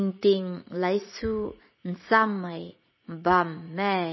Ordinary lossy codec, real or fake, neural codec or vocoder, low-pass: MP3, 24 kbps; fake; vocoder, 44.1 kHz, 80 mel bands, Vocos; 7.2 kHz